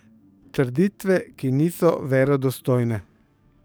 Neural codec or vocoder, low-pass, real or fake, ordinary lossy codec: codec, 44.1 kHz, 7.8 kbps, DAC; none; fake; none